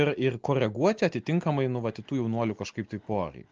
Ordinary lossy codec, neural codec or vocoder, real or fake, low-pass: Opus, 24 kbps; none; real; 7.2 kHz